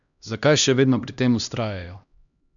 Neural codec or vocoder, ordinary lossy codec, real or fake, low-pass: codec, 16 kHz, 1 kbps, X-Codec, HuBERT features, trained on LibriSpeech; none; fake; 7.2 kHz